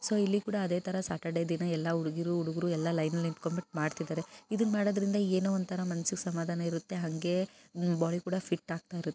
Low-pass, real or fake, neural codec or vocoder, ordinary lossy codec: none; real; none; none